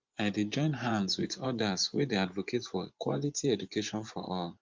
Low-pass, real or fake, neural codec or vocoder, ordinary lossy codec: 7.2 kHz; fake; vocoder, 24 kHz, 100 mel bands, Vocos; Opus, 32 kbps